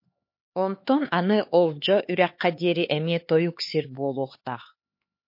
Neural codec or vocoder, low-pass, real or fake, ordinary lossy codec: codec, 16 kHz, 4 kbps, X-Codec, HuBERT features, trained on LibriSpeech; 5.4 kHz; fake; MP3, 32 kbps